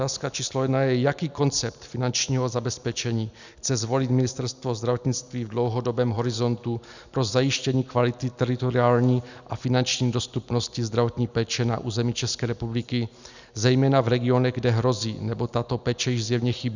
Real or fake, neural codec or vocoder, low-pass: real; none; 7.2 kHz